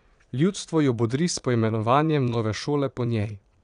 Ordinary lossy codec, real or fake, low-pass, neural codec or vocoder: none; fake; 9.9 kHz; vocoder, 22.05 kHz, 80 mel bands, Vocos